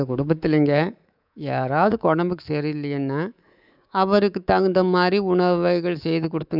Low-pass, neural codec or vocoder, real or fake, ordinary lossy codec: 5.4 kHz; codec, 24 kHz, 3.1 kbps, DualCodec; fake; none